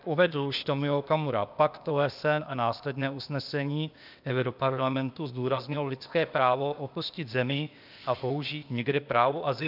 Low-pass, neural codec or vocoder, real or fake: 5.4 kHz; codec, 16 kHz, 0.8 kbps, ZipCodec; fake